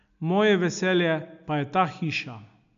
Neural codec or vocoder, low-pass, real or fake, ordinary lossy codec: none; 7.2 kHz; real; none